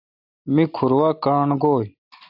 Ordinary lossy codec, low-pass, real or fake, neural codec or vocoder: AAC, 48 kbps; 5.4 kHz; real; none